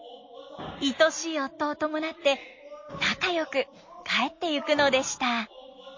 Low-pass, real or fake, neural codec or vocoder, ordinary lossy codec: 7.2 kHz; real; none; MP3, 32 kbps